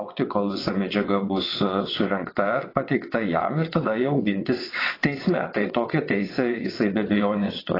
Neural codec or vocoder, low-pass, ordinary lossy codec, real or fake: vocoder, 22.05 kHz, 80 mel bands, WaveNeXt; 5.4 kHz; AAC, 24 kbps; fake